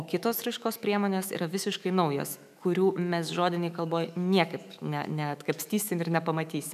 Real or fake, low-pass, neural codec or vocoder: fake; 14.4 kHz; autoencoder, 48 kHz, 128 numbers a frame, DAC-VAE, trained on Japanese speech